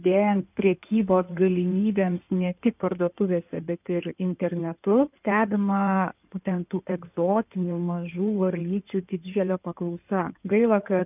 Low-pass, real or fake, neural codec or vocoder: 3.6 kHz; fake; codec, 16 kHz in and 24 kHz out, 2.2 kbps, FireRedTTS-2 codec